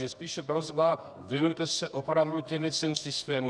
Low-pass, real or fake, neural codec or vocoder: 9.9 kHz; fake; codec, 24 kHz, 0.9 kbps, WavTokenizer, medium music audio release